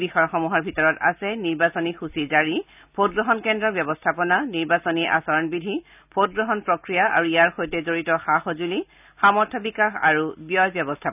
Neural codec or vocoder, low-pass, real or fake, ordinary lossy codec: none; 3.6 kHz; real; none